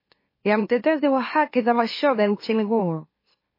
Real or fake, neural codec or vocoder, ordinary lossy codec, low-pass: fake; autoencoder, 44.1 kHz, a latent of 192 numbers a frame, MeloTTS; MP3, 24 kbps; 5.4 kHz